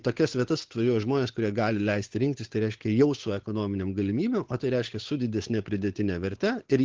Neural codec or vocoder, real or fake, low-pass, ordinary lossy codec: codec, 16 kHz, 8 kbps, FunCodec, trained on Chinese and English, 25 frames a second; fake; 7.2 kHz; Opus, 16 kbps